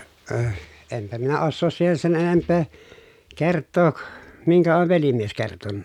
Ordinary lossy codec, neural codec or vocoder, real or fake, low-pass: none; none; real; 19.8 kHz